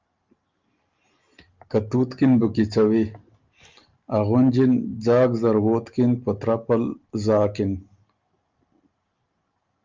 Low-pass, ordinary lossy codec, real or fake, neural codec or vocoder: 7.2 kHz; Opus, 24 kbps; real; none